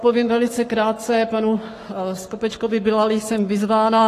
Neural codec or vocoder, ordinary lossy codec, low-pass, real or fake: codec, 44.1 kHz, 7.8 kbps, Pupu-Codec; AAC, 48 kbps; 14.4 kHz; fake